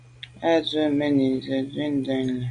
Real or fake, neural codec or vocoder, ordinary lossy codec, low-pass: real; none; AAC, 64 kbps; 9.9 kHz